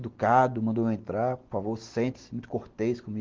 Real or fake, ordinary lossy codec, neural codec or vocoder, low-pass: real; Opus, 16 kbps; none; 7.2 kHz